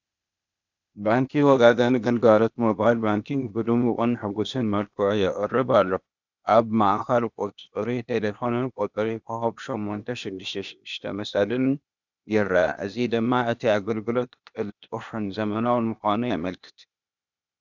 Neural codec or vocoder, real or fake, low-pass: codec, 16 kHz, 0.8 kbps, ZipCodec; fake; 7.2 kHz